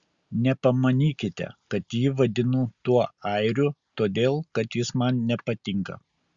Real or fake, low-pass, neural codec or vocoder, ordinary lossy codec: real; 7.2 kHz; none; Opus, 64 kbps